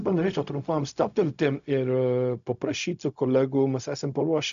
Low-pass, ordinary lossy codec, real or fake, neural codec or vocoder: 7.2 kHz; MP3, 96 kbps; fake; codec, 16 kHz, 0.4 kbps, LongCat-Audio-Codec